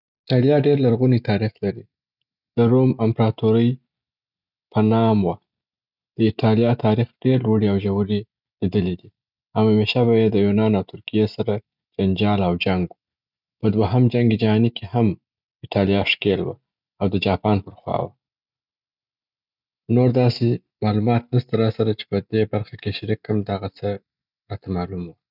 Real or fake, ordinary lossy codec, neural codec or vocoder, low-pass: real; none; none; 5.4 kHz